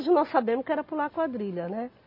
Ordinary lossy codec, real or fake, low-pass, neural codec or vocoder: MP3, 32 kbps; real; 5.4 kHz; none